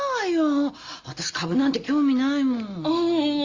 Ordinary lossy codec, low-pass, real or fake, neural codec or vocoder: Opus, 32 kbps; 7.2 kHz; real; none